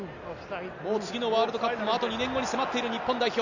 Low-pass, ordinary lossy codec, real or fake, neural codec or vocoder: 7.2 kHz; none; real; none